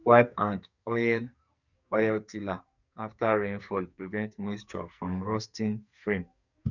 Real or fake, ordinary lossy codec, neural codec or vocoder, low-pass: fake; none; codec, 44.1 kHz, 2.6 kbps, SNAC; 7.2 kHz